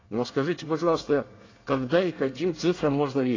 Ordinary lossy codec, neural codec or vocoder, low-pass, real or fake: AAC, 32 kbps; codec, 24 kHz, 1 kbps, SNAC; 7.2 kHz; fake